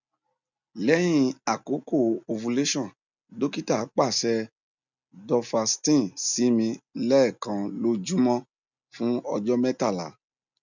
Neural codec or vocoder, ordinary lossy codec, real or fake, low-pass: none; none; real; 7.2 kHz